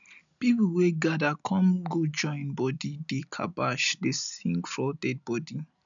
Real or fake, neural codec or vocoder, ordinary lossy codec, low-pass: real; none; none; 7.2 kHz